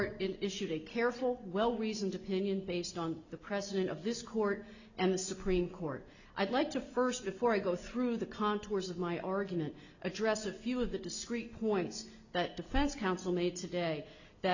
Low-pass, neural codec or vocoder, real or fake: 7.2 kHz; none; real